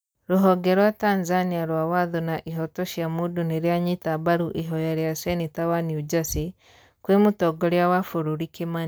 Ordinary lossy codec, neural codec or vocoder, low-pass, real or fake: none; none; none; real